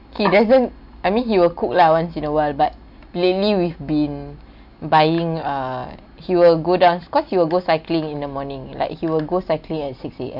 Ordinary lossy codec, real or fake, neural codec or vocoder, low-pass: none; real; none; 5.4 kHz